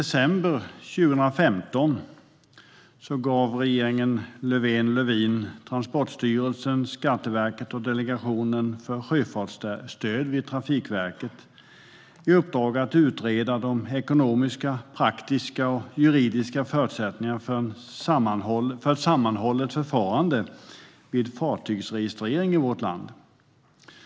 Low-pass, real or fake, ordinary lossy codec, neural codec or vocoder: none; real; none; none